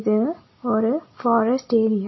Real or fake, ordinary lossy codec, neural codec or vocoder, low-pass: real; MP3, 24 kbps; none; 7.2 kHz